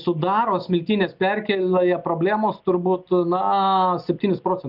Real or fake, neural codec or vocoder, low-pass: real; none; 5.4 kHz